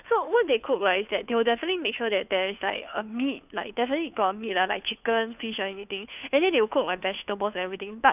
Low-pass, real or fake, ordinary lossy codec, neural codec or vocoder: 3.6 kHz; fake; none; codec, 16 kHz, 2 kbps, FunCodec, trained on Chinese and English, 25 frames a second